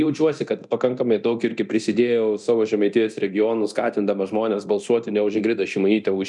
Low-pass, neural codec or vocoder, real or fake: 10.8 kHz; codec, 24 kHz, 0.9 kbps, DualCodec; fake